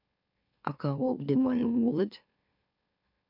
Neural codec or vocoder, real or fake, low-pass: autoencoder, 44.1 kHz, a latent of 192 numbers a frame, MeloTTS; fake; 5.4 kHz